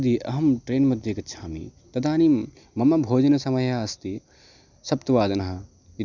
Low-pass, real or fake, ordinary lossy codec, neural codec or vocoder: 7.2 kHz; real; none; none